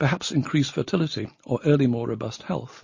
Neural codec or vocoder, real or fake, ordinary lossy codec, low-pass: none; real; MP3, 32 kbps; 7.2 kHz